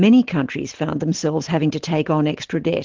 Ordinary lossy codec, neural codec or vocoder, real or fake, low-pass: Opus, 16 kbps; none; real; 7.2 kHz